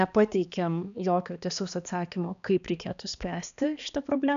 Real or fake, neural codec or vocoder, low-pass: fake; codec, 16 kHz, 2 kbps, X-Codec, HuBERT features, trained on balanced general audio; 7.2 kHz